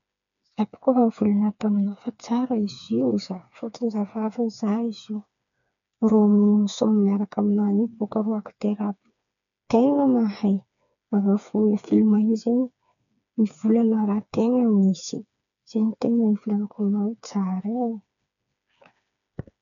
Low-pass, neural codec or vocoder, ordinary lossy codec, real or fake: 7.2 kHz; codec, 16 kHz, 4 kbps, FreqCodec, smaller model; none; fake